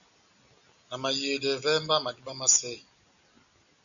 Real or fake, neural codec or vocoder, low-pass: real; none; 7.2 kHz